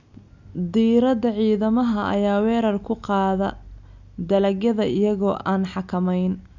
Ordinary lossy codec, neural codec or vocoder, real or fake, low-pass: none; none; real; 7.2 kHz